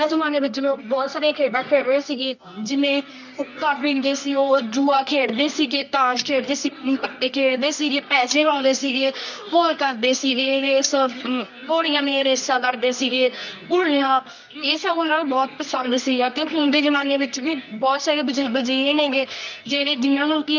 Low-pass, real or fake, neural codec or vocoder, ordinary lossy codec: 7.2 kHz; fake; codec, 24 kHz, 0.9 kbps, WavTokenizer, medium music audio release; none